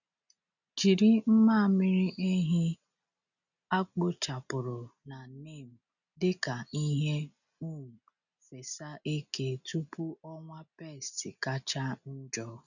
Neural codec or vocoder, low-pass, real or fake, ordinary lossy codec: none; 7.2 kHz; real; none